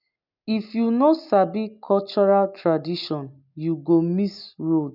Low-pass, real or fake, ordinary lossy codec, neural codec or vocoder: 5.4 kHz; real; none; none